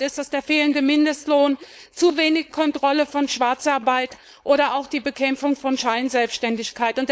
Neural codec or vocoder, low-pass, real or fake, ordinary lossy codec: codec, 16 kHz, 4.8 kbps, FACodec; none; fake; none